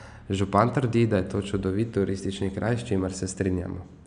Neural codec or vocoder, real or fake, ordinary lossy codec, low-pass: none; real; none; 9.9 kHz